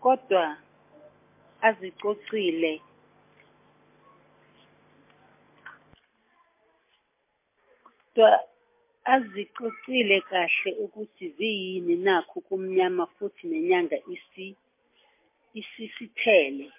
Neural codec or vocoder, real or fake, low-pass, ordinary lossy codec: none; real; 3.6 kHz; MP3, 24 kbps